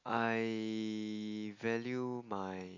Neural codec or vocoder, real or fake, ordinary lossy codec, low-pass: none; real; AAC, 48 kbps; 7.2 kHz